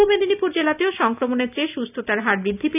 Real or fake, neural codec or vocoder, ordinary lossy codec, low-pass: real; none; none; 3.6 kHz